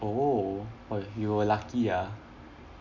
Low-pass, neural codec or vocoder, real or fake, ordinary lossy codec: 7.2 kHz; none; real; none